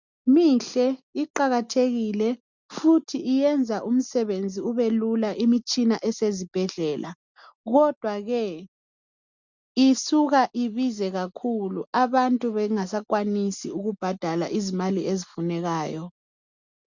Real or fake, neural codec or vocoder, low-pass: real; none; 7.2 kHz